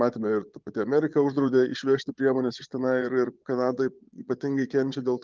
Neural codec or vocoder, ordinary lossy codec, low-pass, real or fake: vocoder, 22.05 kHz, 80 mel bands, Vocos; Opus, 32 kbps; 7.2 kHz; fake